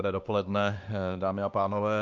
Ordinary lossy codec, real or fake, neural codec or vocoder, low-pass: Opus, 24 kbps; fake; codec, 16 kHz, 2 kbps, X-Codec, WavLM features, trained on Multilingual LibriSpeech; 7.2 kHz